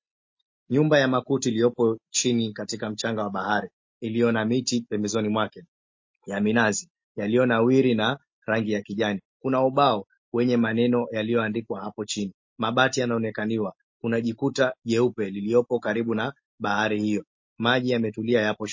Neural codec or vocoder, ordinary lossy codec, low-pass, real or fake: none; MP3, 32 kbps; 7.2 kHz; real